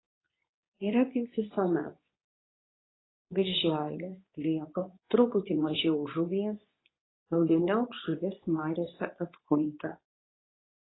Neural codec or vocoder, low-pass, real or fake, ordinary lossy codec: codec, 24 kHz, 0.9 kbps, WavTokenizer, medium speech release version 2; 7.2 kHz; fake; AAC, 16 kbps